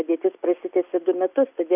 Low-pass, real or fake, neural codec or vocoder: 3.6 kHz; real; none